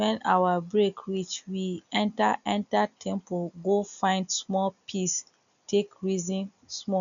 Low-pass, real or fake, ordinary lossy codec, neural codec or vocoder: 7.2 kHz; real; none; none